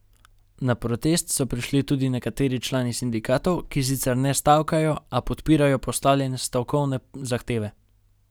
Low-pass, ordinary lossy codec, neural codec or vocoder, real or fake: none; none; none; real